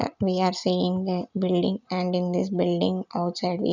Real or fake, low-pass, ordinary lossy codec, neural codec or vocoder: real; 7.2 kHz; none; none